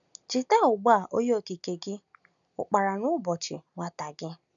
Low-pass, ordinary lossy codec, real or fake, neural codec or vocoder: 7.2 kHz; none; real; none